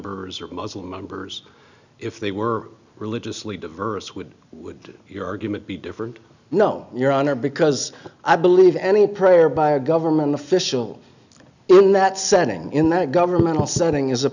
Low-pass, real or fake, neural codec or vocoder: 7.2 kHz; real; none